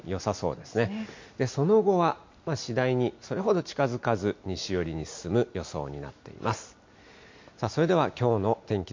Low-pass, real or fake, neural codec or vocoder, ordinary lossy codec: 7.2 kHz; real; none; MP3, 48 kbps